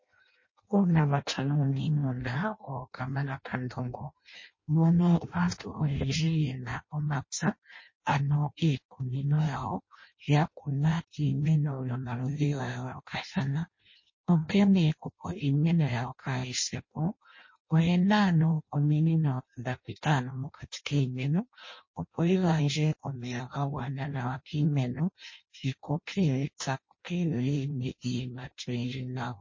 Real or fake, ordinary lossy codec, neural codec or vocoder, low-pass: fake; MP3, 32 kbps; codec, 16 kHz in and 24 kHz out, 0.6 kbps, FireRedTTS-2 codec; 7.2 kHz